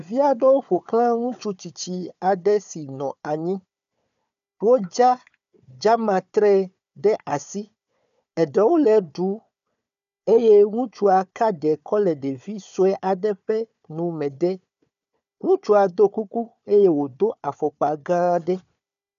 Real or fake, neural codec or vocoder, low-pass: fake; codec, 16 kHz, 4 kbps, FunCodec, trained on Chinese and English, 50 frames a second; 7.2 kHz